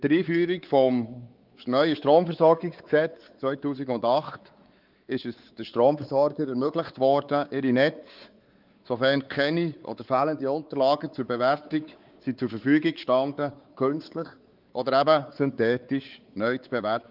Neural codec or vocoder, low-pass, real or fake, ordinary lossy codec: codec, 16 kHz, 4 kbps, X-Codec, WavLM features, trained on Multilingual LibriSpeech; 5.4 kHz; fake; Opus, 32 kbps